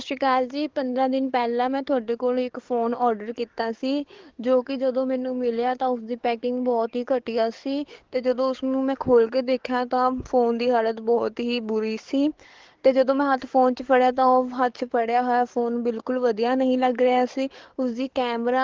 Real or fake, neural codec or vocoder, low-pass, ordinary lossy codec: fake; codec, 44.1 kHz, 7.8 kbps, DAC; 7.2 kHz; Opus, 16 kbps